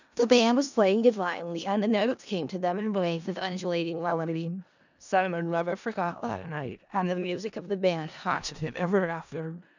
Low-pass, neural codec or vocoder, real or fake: 7.2 kHz; codec, 16 kHz in and 24 kHz out, 0.4 kbps, LongCat-Audio-Codec, four codebook decoder; fake